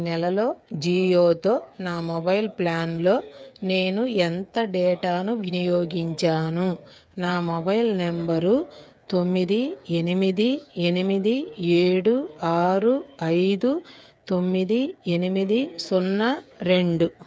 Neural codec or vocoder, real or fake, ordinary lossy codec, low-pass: codec, 16 kHz, 8 kbps, FreqCodec, smaller model; fake; none; none